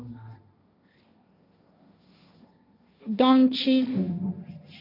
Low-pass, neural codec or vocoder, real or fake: 5.4 kHz; codec, 16 kHz, 1.1 kbps, Voila-Tokenizer; fake